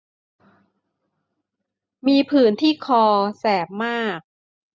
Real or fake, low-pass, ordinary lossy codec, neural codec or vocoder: real; none; none; none